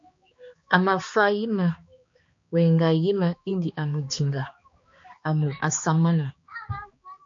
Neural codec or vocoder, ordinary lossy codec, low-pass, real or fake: codec, 16 kHz, 2 kbps, X-Codec, HuBERT features, trained on balanced general audio; MP3, 48 kbps; 7.2 kHz; fake